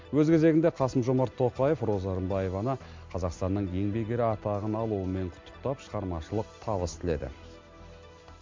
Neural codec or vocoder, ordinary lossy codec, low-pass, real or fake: none; MP3, 64 kbps; 7.2 kHz; real